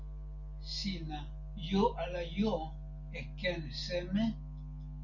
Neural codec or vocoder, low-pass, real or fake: none; 7.2 kHz; real